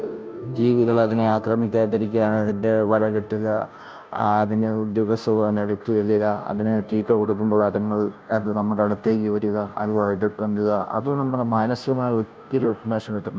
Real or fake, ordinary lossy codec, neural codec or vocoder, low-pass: fake; none; codec, 16 kHz, 0.5 kbps, FunCodec, trained on Chinese and English, 25 frames a second; none